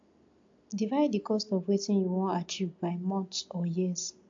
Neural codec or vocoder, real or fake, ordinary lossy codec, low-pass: none; real; AAC, 48 kbps; 7.2 kHz